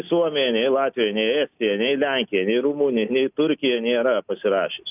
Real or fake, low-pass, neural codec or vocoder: real; 3.6 kHz; none